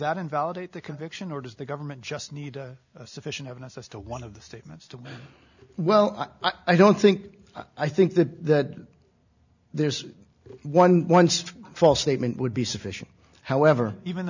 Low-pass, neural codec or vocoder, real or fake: 7.2 kHz; none; real